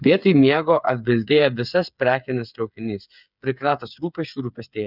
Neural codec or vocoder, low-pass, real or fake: codec, 16 kHz, 8 kbps, FreqCodec, smaller model; 5.4 kHz; fake